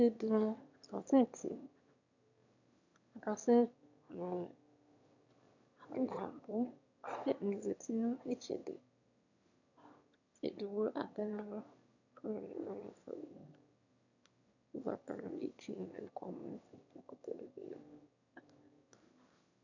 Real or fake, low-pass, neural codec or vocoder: fake; 7.2 kHz; autoencoder, 22.05 kHz, a latent of 192 numbers a frame, VITS, trained on one speaker